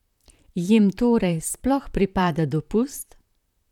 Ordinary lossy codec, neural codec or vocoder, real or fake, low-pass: none; vocoder, 44.1 kHz, 128 mel bands, Pupu-Vocoder; fake; 19.8 kHz